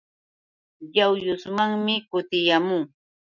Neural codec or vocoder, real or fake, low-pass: none; real; 7.2 kHz